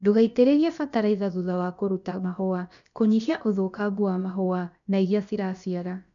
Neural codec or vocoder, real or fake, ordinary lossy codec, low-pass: codec, 16 kHz, about 1 kbps, DyCAST, with the encoder's durations; fake; none; 7.2 kHz